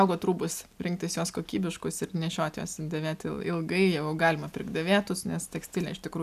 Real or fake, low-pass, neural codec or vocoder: real; 14.4 kHz; none